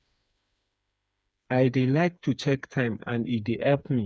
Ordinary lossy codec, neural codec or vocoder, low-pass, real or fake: none; codec, 16 kHz, 4 kbps, FreqCodec, smaller model; none; fake